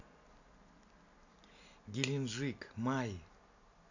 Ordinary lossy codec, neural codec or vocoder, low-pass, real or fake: none; none; 7.2 kHz; real